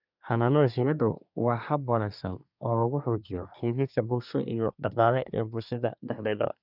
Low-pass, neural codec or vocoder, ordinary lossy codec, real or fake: 5.4 kHz; codec, 24 kHz, 1 kbps, SNAC; none; fake